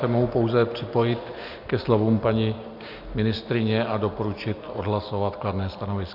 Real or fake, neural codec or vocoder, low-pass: real; none; 5.4 kHz